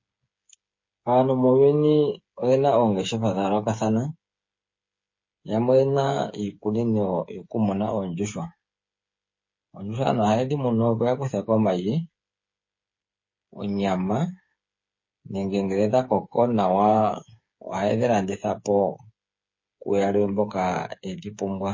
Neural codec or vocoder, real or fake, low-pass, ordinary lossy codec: codec, 16 kHz, 8 kbps, FreqCodec, smaller model; fake; 7.2 kHz; MP3, 32 kbps